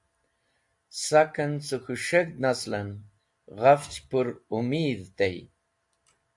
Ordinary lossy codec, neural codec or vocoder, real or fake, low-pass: AAC, 64 kbps; none; real; 10.8 kHz